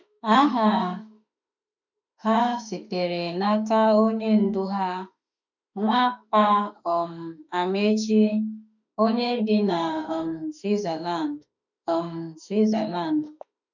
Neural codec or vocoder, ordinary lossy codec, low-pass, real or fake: autoencoder, 48 kHz, 32 numbers a frame, DAC-VAE, trained on Japanese speech; none; 7.2 kHz; fake